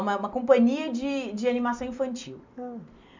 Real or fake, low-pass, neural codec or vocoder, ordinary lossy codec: real; 7.2 kHz; none; MP3, 64 kbps